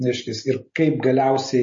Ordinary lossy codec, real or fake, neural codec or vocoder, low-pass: MP3, 32 kbps; real; none; 7.2 kHz